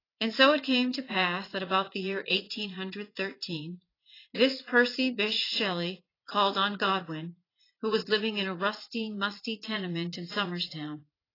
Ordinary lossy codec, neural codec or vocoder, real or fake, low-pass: AAC, 24 kbps; vocoder, 44.1 kHz, 80 mel bands, Vocos; fake; 5.4 kHz